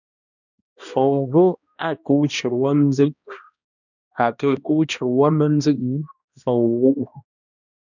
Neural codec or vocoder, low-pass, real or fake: codec, 16 kHz, 1 kbps, X-Codec, HuBERT features, trained on balanced general audio; 7.2 kHz; fake